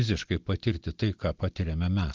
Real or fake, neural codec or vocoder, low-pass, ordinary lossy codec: real; none; 7.2 kHz; Opus, 32 kbps